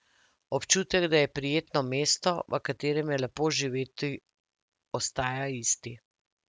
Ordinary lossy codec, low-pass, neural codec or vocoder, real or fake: none; none; none; real